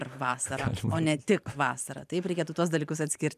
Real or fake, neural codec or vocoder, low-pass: fake; vocoder, 44.1 kHz, 128 mel bands every 512 samples, BigVGAN v2; 14.4 kHz